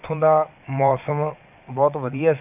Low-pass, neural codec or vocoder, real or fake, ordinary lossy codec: 3.6 kHz; vocoder, 22.05 kHz, 80 mel bands, Vocos; fake; none